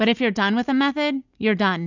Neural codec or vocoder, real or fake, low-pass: none; real; 7.2 kHz